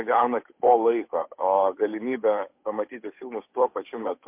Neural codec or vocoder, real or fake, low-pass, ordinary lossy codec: codec, 16 kHz, 8 kbps, FunCodec, trained on Chinese and English, 25 frames a second; fake; 3.6 kHz; MP3, 32 kbps